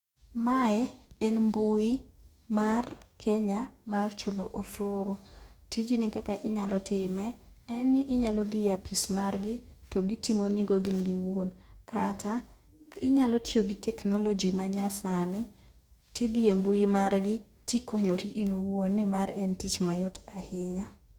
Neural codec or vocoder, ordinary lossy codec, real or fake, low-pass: codec, 44.1 kHz, 2.6 kbps, DAC; Opus, 64 kbps; fake; 19.8 kHz